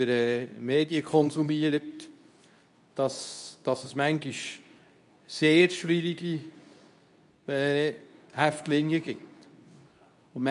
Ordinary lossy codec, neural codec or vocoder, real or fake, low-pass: none; codec, 24 kHz, 0.9 kbps, WavTokenizer, medium speech release version 2; fake; 10.8 kHz